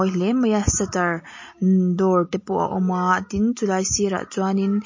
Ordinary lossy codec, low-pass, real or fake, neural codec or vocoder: MP3, 32 kbps; 7.2 kHz; real; none